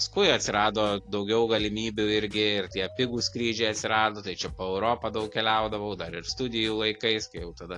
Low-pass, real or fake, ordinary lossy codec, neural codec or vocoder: 10.8 kHz; real; AAC, 48 kbps; none